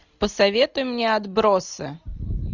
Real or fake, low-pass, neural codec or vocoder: real; 7.2 kHz; none